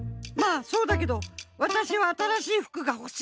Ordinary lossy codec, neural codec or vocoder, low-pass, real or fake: none; none; none; real